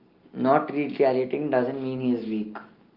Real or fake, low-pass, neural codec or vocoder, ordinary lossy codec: real; 5.4 kHz; none; Opus, 24 kbps